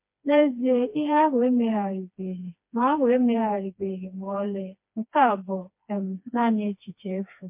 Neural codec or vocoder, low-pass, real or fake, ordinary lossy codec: codec, 16 kHz, 2 kbps, FreqCodec, smaller model; 3.6 kHz; fake; MP3, 32 kbps